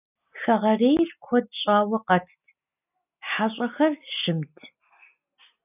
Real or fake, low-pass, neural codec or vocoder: real; 3.6 kHz; none